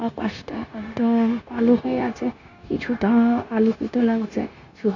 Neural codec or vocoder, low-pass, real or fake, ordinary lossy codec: codec, 16 kHz, 0.9 kbps, LongCat-Audio-Codec; 7.2 kHz; fake; none